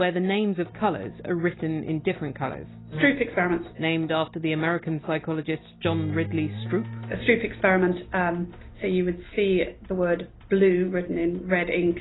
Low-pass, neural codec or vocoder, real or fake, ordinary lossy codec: 7.2 kHz; none; real; AAC, 16 kbps